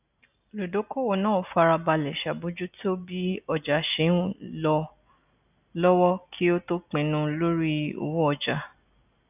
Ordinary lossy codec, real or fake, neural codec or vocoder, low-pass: none; real; none; 3.6 kHz